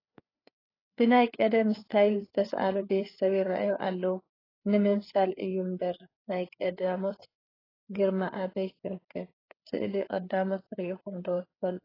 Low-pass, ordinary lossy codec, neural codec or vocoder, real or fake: 5.4 kHz; AAC, 24 kbps; codec, 16 kHz, 4 kbps, FreqCodec, larger model; fake